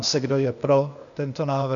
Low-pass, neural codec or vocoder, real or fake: 7.2 kHz; codec, 16 kHz, 0.8 kbps, ZipCodec; fake